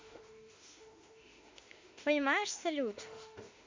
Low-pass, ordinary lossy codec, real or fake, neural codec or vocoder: 7.2 kHz; MP3, 48 kbps; fake; autoencoder, 48 kHz, 32 numbers a frame, DAC-VAE, trained on Japanese speech